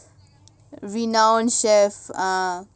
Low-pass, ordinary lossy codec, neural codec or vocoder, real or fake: none; none; none; real